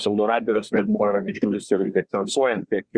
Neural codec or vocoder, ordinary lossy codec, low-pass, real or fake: codec, 24 kHz, 1 kbps, SNAC; Opus, 64 kbps; 9.9 kHz; fake